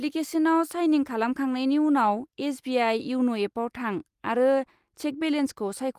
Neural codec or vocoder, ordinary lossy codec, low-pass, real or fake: none; Opus, 32 kbps; 14.4 kHz; real